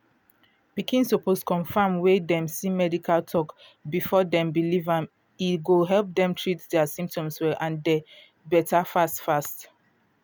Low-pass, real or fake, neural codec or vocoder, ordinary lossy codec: none; real; none; none